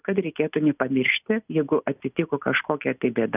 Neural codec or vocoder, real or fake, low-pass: none; real; 3.6 kHz